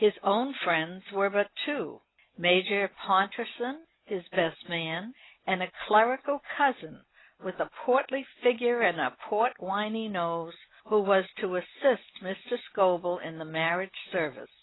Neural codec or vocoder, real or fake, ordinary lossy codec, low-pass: none; real; AAC, 16 kbps; 7.2 kHz